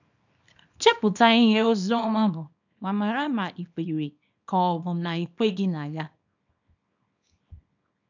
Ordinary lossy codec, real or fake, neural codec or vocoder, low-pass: none; fake; codec, 24 kHz, 0.9 kbps, WavTokenizer, small release; 7.2 kHz